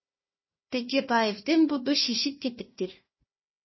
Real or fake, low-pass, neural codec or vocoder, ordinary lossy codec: fake; 7.2 kHz; codec, 16 kHz, 1 kbps, FunCodec, trained on Chinese and English, 50 frames a second; MP3, 24 kbps